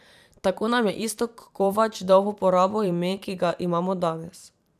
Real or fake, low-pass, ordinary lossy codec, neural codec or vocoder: fake; 14.4 kHz; none; vocoder, 44.1 kHz, 128 mel bands every 512 samples, BigVGAN v2